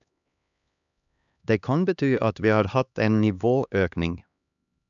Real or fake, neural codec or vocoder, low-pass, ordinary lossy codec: fake; codec, 16 kHz, 2 kbps, X-Codec, HuBERT features, trained on LibriSpeech; 7.2 kHz; none